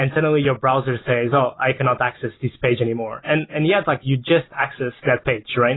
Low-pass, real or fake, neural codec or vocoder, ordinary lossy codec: 7.2 kHz; real; none; AAC, 16 kbps